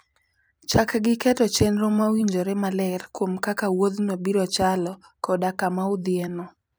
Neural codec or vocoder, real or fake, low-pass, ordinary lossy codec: vocoder, 44.1 kHz, 128 mel bands every 256 samples, BigVGAN v2; fake; none; none